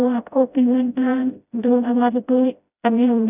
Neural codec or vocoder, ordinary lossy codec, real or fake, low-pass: codec, 16 kHz, 0.5 kbps, FreqCodec, smaller model; none; fake; 3.6 kHz